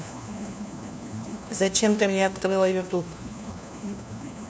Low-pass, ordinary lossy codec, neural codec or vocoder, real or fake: none; none; codec, 16 kHz, 1 kbps, FunCodec, trained on LibriTTS, 50 frames a second; fake